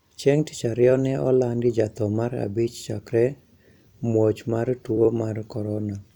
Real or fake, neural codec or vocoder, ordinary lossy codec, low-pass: fake; vocoder, 44.1 kHz, 128 mel bands every 256 samples, BigVGAN v2; none; 19.8 kHz